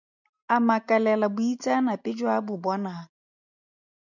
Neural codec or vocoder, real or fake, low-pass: none; real; 7.2 kHz